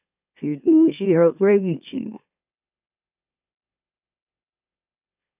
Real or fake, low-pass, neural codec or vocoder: fake; 3.6 kHz; autoencoder, 44.1 kHz, a latent of 192 numbers a frame, MeloTTS